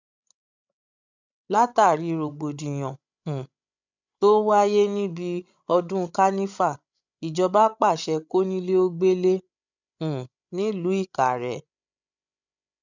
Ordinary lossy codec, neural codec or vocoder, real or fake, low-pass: none; codec, 16 kHz, 16 kbps, FreqCodec, larger model; fake; 7.2 kHz